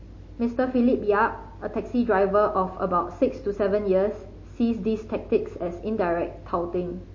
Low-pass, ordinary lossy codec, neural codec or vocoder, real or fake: 7.2 kHz; MP3, 32 kbps; none; real